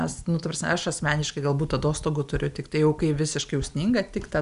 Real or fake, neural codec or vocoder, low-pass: real; none; 10.8 kHz